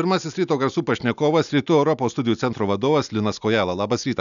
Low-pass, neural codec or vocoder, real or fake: 7.2 kHz; none; real